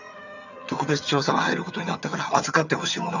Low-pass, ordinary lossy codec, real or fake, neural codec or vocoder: 7.2 kHz; none; fake; vocoder, 22.05 kHz, 80 mel bands, HiFi-GAN